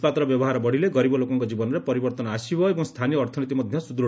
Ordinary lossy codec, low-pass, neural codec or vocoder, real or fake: none; none; none; real